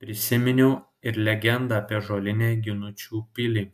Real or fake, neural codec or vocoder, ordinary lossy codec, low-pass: real; none; MP3, 96 kbps; 14.4 kHz